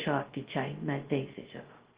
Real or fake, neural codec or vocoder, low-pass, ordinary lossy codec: fake; codec, 16 kHz, 0.2 kbps, FocalCodec; 3.6 kHz; Opus, 16 kbps